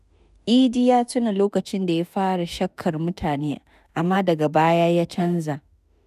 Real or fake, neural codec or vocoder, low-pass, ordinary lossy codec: fake; autoencoder, 48 kHz, 32 numbers a frame, DAC-VAE, trained on Japanese speech; 14.4 kHz; none